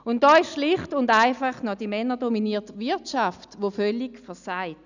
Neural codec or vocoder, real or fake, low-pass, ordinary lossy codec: none; real; 7.2 kHz; none